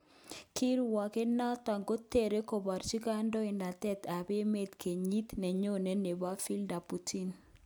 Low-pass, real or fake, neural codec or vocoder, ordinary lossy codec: none; real; none; none